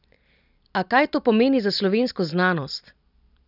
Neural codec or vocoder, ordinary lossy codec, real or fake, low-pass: none; none; real; 5.4 kHz